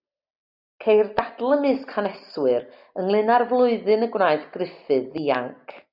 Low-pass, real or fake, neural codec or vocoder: 5.4 kHz; real; none